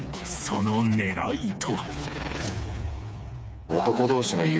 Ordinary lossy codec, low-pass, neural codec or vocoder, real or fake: none; none; codec, 16 kHz, 4 kbps, FreqCodec, smaller model; fake